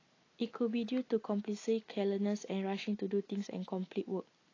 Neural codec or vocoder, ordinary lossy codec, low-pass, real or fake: none; AAC, 32 kbps; 7.2 kHz; real